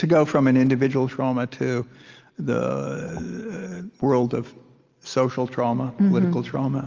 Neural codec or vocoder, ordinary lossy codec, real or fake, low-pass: none; Opus, 32 kbps; real; 7.2 kHz